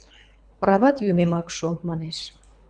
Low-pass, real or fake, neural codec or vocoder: 9.9 kHz; fake; codec, 24 kHz, 3 kbps, HILCodec